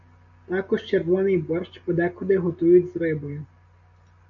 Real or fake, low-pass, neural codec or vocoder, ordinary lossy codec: real; 7.2 kHz; none; MP3, 96 kbps